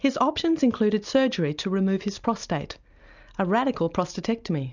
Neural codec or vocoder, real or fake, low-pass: none; real; 7.2 kHz